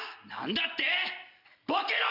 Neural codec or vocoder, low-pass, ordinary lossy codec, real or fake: none; 5.4 kHz; none; real